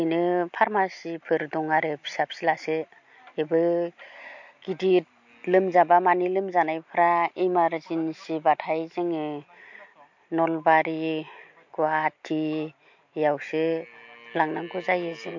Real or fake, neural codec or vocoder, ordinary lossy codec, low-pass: real; none; MP3, 48 kbps; 7.2 kHz